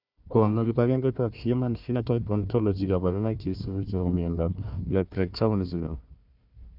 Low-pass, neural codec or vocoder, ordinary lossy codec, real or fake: 5.4 kHz; codec, 16 kHz, 1 kbps, FunCodec, trained on Chinese and English, 50 frames a second; none; fake